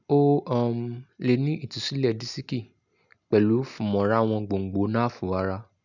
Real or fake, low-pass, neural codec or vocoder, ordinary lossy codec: real; 7.2 kHz; none; none